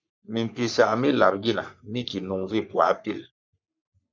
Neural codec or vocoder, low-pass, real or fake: codec, 44.1 kHz, 3.4 kbps, Pupu-Codec; 7.2 kHz; fake